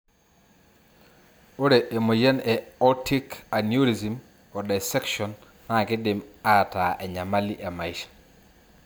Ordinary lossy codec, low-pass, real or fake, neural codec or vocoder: none; none; real; none